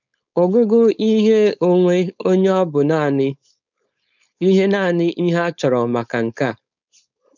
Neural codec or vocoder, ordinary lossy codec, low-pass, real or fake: codec, 16 kHz, 4.8 kbps, FACodec; none; 7.2 kHz; fake